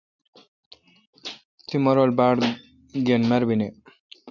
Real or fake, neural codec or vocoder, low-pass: real; none; 7.2 kHz